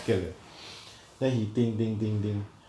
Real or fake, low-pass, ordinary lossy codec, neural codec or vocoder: real; none; none; none